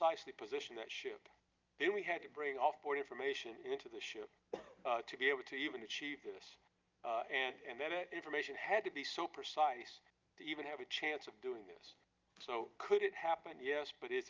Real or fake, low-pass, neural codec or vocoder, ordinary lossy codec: real; 7.2 kHz; none; Opus, 32 kbps